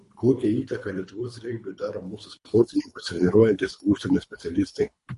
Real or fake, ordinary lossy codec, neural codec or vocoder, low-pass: fake; MP3, 48 kbps; codec, 24 kHz, 3 kbps, HILCodec; 10.8 kHz